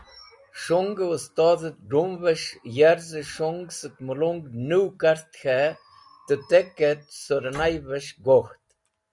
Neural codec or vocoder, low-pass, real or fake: none; 10.8 kHz; real